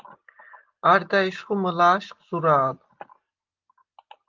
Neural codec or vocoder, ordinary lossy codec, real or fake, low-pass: none; Opus, 32 kbps; real; 7.2 kHz